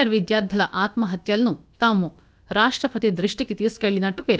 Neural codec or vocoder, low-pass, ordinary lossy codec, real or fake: codec, 16 kHz, about 1 kbps, DyCAST, with the encoder's durations; none; none; fake